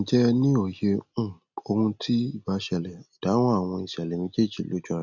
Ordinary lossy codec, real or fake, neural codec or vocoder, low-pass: none; real; none; 7.2 kHz